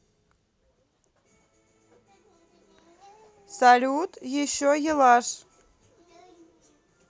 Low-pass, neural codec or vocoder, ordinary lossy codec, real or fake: none; none; none; real